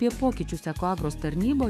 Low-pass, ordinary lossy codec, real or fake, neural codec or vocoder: 14.4 kHz; AAC, 96 kbps; fake; autoencoder, 48 kHz, 128 numbers a frame, DAC-VAE, trained on Japanese speech